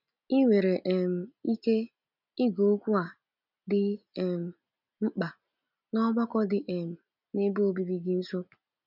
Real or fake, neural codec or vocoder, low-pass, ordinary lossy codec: real; none; 5.4 kHz; none